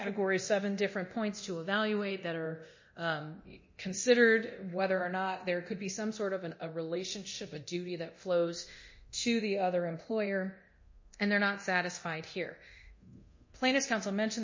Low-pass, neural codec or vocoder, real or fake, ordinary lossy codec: 7.2 kHz; codec, 24 kHz, 0.9 kbps, DualCodec; fake; MP3, 32 kbps